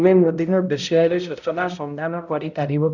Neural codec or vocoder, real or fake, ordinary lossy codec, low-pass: codec, 16 kHz, 0.5 kbps, X-Codec, HuBERT features, trained on balanced general audio; fake; none; 7.2 kHz